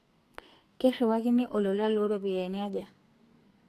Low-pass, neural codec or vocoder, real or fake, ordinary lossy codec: 14.4 kHz; codec, 44.1 kHz, 2.6 kbps, SNAC; fake; AAC, 64 kbps